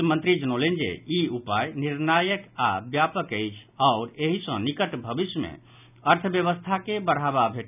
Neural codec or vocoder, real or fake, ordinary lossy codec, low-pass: none; real; none; 3.6 kHz